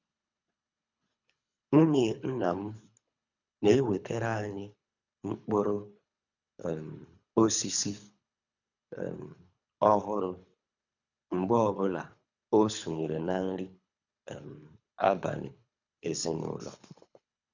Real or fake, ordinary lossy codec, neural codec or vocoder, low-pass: fake; none; codec, 24 kHz, 3 kbps, HILCodec; 7.2 kHz